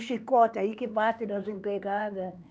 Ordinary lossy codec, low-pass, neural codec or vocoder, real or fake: none; none; codec, 16 kHz, 4 kbps, X-Codec, HuBERT features, trained on LibriSpeech; fake